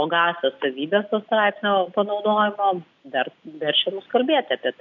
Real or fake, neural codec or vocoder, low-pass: real; none; 7.2 kHz